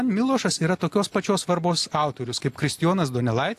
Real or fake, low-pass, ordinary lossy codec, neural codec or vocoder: real; 14.4 kHz; AAC, 64 kbps; none